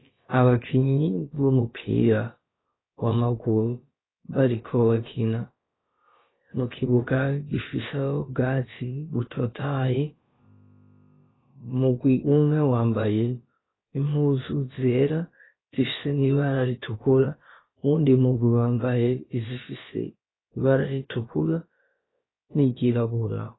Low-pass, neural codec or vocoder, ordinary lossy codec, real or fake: 7.2 kHz; codec, 16 kHz, about 1 kbps, DyCAST, with the encoder's durations; AAC, 16 kbps; fake